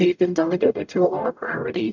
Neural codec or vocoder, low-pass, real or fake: codec, 44.1 kHz, 0.9 kbps, DAC; 7.2 kHz; fake